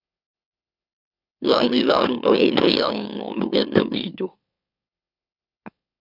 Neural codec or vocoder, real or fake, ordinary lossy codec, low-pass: autoencoder, 44.1 kHz, a latent of 192 numbers a frame, MeloTTS; fake; AAC, 48 kbps; 5.4 kHz